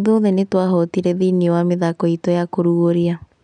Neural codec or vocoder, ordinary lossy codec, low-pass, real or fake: none; none; 9.9 kHz; real